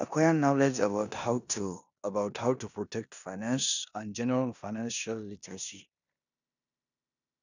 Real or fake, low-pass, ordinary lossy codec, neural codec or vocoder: fake; 7.2 kHz; none; codec, 16 kHz in and 24 kHz out, 0.9 kbps, LongCat-Audio-Codec, four codebook decoder